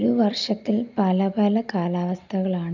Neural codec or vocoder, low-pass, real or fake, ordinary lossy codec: none; 7.2 kHz; real; none